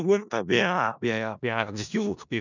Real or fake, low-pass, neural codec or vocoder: fake; 7.2 kHz; codec, 16 kHz in and 24 kHz out, 0.4 kbps, LongCat-Audio-Codec, four codebook decoder